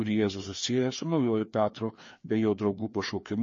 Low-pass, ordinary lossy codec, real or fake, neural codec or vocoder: 7.2 kHz; MP3, 32 kbps; fake; codec, 16 kHz, 2 kbps, FreqCodec, larger model